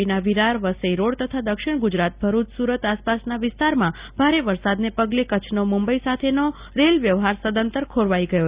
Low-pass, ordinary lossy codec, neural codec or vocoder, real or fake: 3.6 kHz; Opus, 64 kbps; none; real